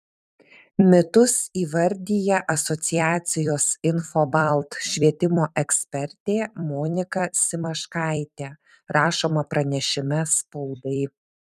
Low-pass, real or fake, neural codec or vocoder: 14.4 kHz; fake; vocoder, 44.1 kHz, 128 mel bands every 256 samples, BigVGAN v2